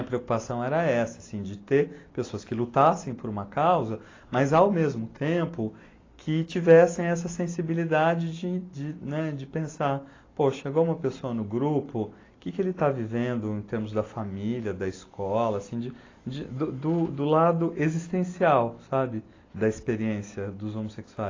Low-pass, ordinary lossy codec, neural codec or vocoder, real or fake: 7.2 kHz; AAC, 32 kbps; none; real